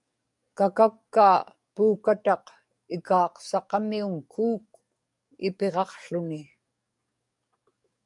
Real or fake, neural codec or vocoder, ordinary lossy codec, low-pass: fake; codec, 44.1 kHz, 7.8 kbps, DAC; MP3, 96 kbps; 10.8 kHz